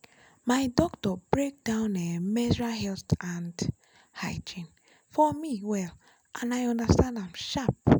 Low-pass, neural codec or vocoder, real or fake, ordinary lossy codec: none; none; real; none